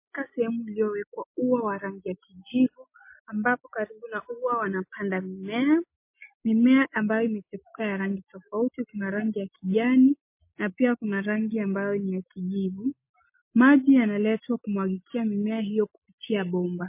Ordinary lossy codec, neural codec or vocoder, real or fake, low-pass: MP3, 24 kbps; none; real; 3.6 kHz